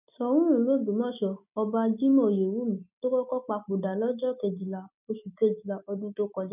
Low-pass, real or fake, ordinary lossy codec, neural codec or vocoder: 3.6 kHz; real; none; none